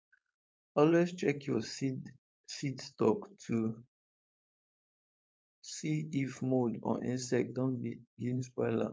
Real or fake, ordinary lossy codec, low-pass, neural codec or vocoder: fake; none; none; codec, 16 kHz, 4.8 kbps, FACodec